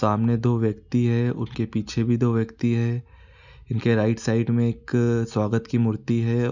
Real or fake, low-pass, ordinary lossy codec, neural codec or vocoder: real; 7.2 kHz; none; none